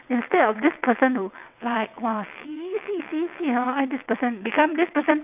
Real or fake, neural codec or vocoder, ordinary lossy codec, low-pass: fake; vocoder, 22.05 kHz, 80 mel bands, WaveNeXt; none; 3.6 kHz